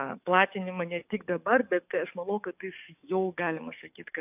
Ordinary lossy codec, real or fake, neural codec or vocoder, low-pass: AAC, 32 kbps; fake; codec, 44.1 kHz, 7.8 kbps, DAC; 3.6 kHz